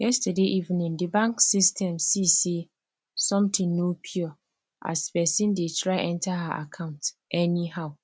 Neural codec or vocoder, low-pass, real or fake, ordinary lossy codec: none; none; real; none